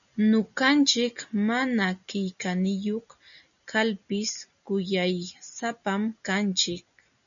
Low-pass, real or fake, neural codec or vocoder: 7.2 kHz; real; none